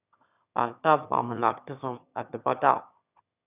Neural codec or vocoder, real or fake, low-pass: autoencoder, 22.05 kHz, a latent of 192 numbers a frame, VITS, trained on one speaker; fake; 3.6 kHz